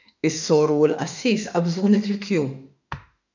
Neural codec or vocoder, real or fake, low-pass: autoencoder, 48 kHz, 32 numbers a frame, DAC-VAE, trained on Japanese speech; fake; 7.2 kHz